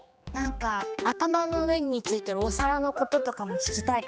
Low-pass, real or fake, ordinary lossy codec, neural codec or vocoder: none; fake; none; codec, 16 kHz, 2 kbps, X-Codec, HuBERT features, trained on general audio